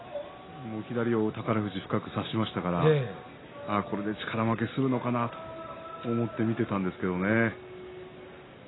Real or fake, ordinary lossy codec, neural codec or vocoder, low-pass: real; AAC, 16 kbps; none; 7.2 kHz